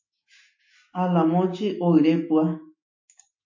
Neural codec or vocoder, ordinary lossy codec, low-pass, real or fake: autoencoder, 48 kHz, 128 numbers a frame, DAC-VAE, trained on Japanese speech; MP3, 32 kbps; 7.2 kHz; fake